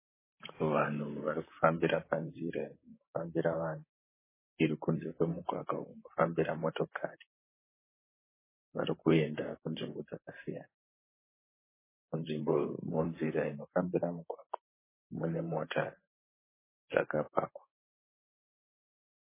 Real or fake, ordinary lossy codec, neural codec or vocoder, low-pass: fake; MP3, 16 kbps; vocoder, 44.1 kHz, 128 mel bands, Pupu-Vocoder; 3.6 kHz